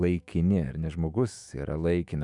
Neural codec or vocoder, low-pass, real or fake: autoencoder, 48 kHz, 128 numbers a frame, DAC-VAE, trained on Japanese speech; 10.8 kHz; fake